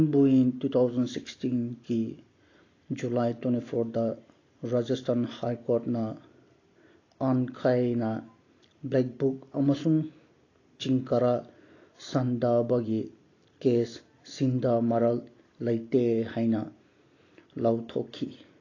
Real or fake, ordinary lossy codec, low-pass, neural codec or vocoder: real; AAC, 32 kbps; 7.2 kHz; none